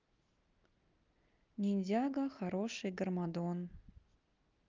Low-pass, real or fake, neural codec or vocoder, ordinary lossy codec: 7.2 kHz; real; none; Opus, 24 kbps